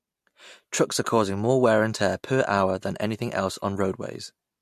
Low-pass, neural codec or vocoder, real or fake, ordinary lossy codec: 14.4 kHz; none; real; MP3, 64 kbps